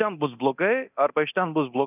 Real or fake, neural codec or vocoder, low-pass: fake; codec, 24 kHz, 0.9 kbps, DualCodec; 3.6 kHz